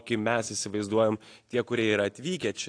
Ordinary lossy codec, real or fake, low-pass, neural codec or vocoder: AAC, 48 kbps; real; 9.9 kHz; none